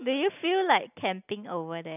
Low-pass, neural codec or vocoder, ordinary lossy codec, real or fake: 3.6 kHz; none; none; real